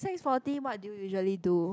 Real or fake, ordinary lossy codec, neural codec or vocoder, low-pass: real; none; none; none